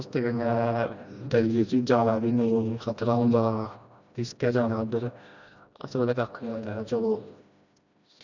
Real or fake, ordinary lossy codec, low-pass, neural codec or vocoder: fake; none; 7.2 kHz; codec, 16 kHz, 1 kbps, FreqCodec, smaller model